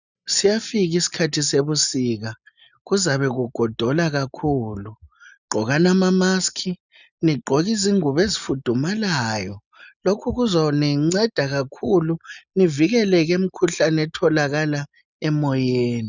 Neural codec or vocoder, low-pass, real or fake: none; 7.2 kHz; real